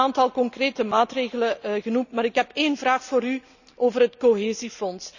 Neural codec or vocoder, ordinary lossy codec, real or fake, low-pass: none; none; real; 7.2 kHz